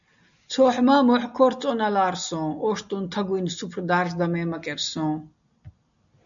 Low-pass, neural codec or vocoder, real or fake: 7.2 kHz; none; real